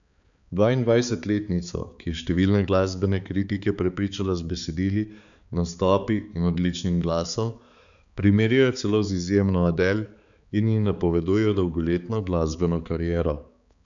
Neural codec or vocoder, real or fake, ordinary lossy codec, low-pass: codec, 16 kHz, 4 kbps, X-Codec, HuBERT features, trained on balanced general audio; fake; none; 7.2 kHz